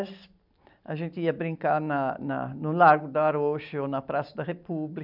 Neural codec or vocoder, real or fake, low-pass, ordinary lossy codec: none; real; 5.4 kHz; none